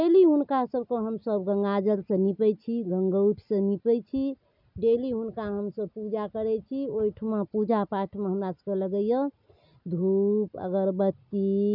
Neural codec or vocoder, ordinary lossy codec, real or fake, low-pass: none; none; real; 5.4 kHz